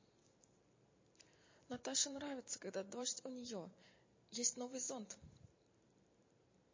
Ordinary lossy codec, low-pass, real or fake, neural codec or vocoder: MP3, 32 kbps; 7.2 kHz; fake; vocoder, 44.1 kHz, 128 mel bands every 512 samples, BigVGAN v2